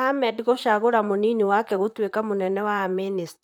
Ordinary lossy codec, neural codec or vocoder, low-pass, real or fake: none; vocoder, 44.1 kHz, 128 mel bands, Pupu-Vocoder; 19.8 kHz; fake